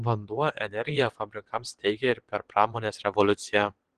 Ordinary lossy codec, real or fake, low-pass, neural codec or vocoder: Opus, 16 kbps; fake; 9.9 kHz; vocoder, 22.05 kHz, 80 mel bands, WaveNeXt